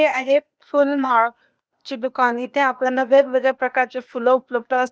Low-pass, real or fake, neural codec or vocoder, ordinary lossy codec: none; fake; codec, 16 kHz, 0.8 kbps, ZipCodec; none